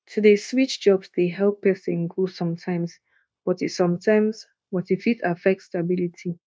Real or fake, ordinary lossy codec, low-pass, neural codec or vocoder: fake; none; none; codec, 16 kHz, 0.9 kbps, LongCat-Audio-Codec